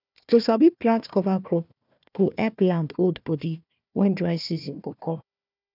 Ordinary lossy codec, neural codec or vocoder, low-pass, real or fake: none; codec, 16 kHz, 1 kbps, FunCodec, trained on Chinese and English, 50 frames a second; 5.4 kHz; fake